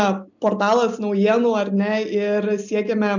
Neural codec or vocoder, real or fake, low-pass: none; real; 7.2 kHz